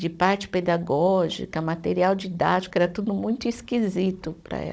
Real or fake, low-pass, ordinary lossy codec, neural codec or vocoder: fake; none; none; codec, 16 kHz, 16 kbps, FunCodec, trained on Chinese and English, 50 frames a second